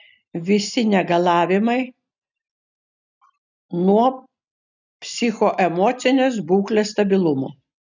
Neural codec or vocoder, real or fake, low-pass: none; real; 7.2 kHz